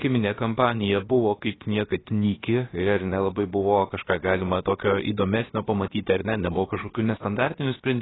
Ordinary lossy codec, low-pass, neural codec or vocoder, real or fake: AAC, 16 kbps; 7.2 kHz; codec, 16 kHz, about 1 kbps, DyCAST, with the encoder's durations; fake